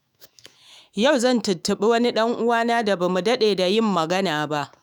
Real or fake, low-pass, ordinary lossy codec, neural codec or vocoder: fake; none; none; autoencoder, 48 kHz, 128 numbers a frame, DAC-VAE, trained on Japanese speech